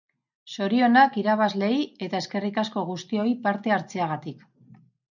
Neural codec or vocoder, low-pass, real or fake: none; 7.2 kHz; real